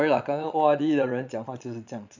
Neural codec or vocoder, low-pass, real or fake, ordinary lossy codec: vocoder, 22.05 kHz, 80 mel bands, Vocos; 7.2 kHz; fake; none